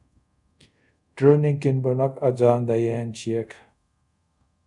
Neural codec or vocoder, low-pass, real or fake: codec, 24 kHz, 0.5 kbps, DualCodec; 10.8 kHz; fake